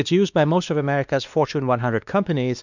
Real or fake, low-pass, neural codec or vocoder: fake; 7.2 kHz; codec, 16 kHz, 2 kbps, X-Codec, WavLM features, trained on Multilingual LibriSpeech